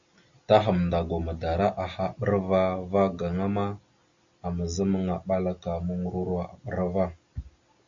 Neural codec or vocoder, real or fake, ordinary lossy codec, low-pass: none; real; Opus, 64 kbps; 7.2 kHz